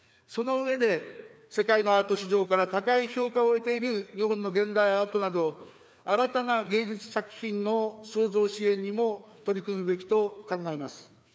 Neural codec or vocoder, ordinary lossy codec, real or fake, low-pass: codec, 16 kHz, 2 kbps, FreqCodec, larger model; none; fake; none